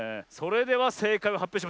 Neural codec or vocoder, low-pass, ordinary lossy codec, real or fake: none; none; none; real